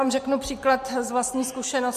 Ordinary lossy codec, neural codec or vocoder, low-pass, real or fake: MP3, 64 kbps; none; 14.4 kHz; real